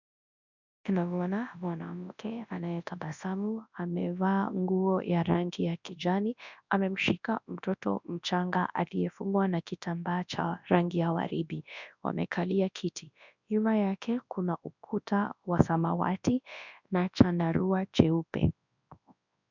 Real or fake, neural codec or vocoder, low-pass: fake; codec, 24 kHz, 0.9 kbps, WavTokenizer, large speech release; 7.2 kHz